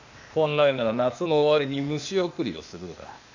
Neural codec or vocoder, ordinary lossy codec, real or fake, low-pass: codec, 16 kHz, 0.8 kbps, ZipCodec; none; fake; 7.2 kHz